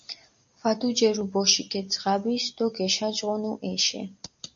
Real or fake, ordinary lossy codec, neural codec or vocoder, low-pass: real; MP3, 64 kbps; none; 7.2 kHz